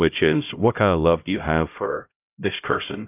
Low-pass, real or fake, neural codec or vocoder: 3.6 kHz; fake; codec, 16 kHz, 0.5 kbps, X-Codec, HuBERT features, trained on LibriSpeech